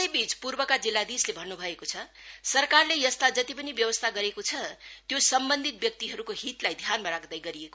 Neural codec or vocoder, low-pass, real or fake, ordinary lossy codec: none; none; real; none